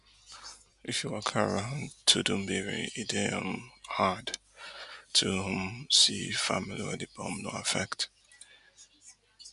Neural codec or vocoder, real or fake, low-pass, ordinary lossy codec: none; real; 10.8 kHz; none